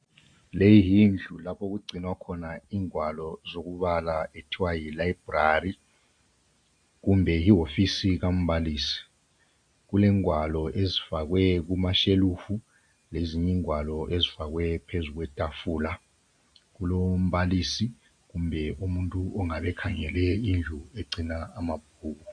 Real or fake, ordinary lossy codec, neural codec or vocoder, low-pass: real; AAC, 64 kbps; none; 9.9 kHz